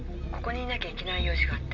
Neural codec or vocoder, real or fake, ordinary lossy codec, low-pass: none; real; none; 7.2 kHz